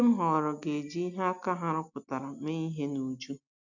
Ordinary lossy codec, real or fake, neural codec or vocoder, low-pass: none; real; none; 7.2 kHz